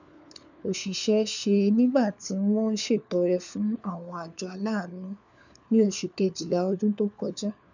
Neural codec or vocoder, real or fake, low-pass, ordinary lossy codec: codec, 16 kHz, 4 kbps, FunCodec, trained on LibriTTS, 50 frames a second; fake; 7.2 kHz; none